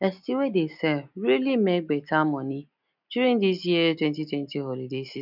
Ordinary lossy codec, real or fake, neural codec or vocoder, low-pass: none; real; none; 5.4 kHz